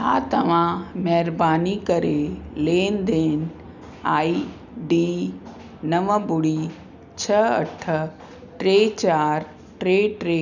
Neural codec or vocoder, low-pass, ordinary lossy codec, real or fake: none; 7.2 kHz; none; real